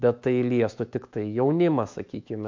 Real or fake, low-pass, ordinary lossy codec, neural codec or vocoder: fake; 7.2 kHz; MP3, 48 kbps; autoencoder, 48 kHz, 128 numbers a frame, DAC-VAE, trained on Japanese speech